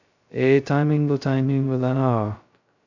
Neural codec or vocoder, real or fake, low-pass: codec, 16 kHz, 0.2 kbps, FocalCodec; fake; 7.2 kHz